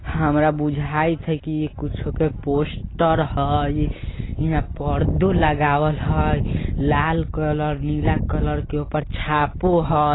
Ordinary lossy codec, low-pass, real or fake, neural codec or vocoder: AAC, 16 kbps; 7.2 kHz; real; none